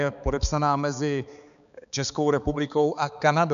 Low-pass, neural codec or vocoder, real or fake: 7.2 kHz; codec, 16 kHz, 4 kbps, X-Codec, HuBERT features, trained on balanced general audio; fake